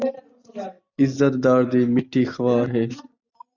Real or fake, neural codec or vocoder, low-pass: real; none; 7.2 kHz